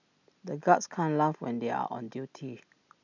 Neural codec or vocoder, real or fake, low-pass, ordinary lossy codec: none; real; 7.2 kHz; none